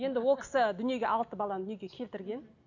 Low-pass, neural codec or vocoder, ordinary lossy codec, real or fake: 7.2 kHz; none; none; real